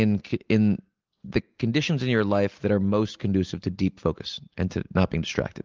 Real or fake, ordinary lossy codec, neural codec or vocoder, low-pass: real; Opus, 32 kbps; none; 7.2 kHz